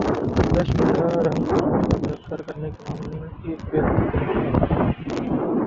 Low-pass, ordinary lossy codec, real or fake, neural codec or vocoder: 7.2 kHz; Opus, 16 kbps; real; none